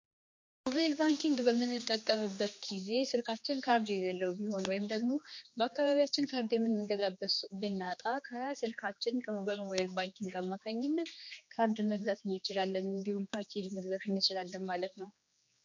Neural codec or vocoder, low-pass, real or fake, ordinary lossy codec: codec, 16 kHz, 2 kbps, X-Codec, HuBERT features, trained on general audio; 7.2 kHz; fake; MP3, 48 kbps